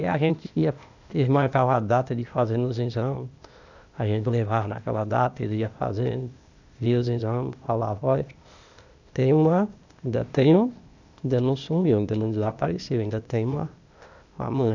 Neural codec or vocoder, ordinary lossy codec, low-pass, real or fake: codec, 16 kHz, 0.8 kbps, ZipCodec; none; 7.2 kHz; fake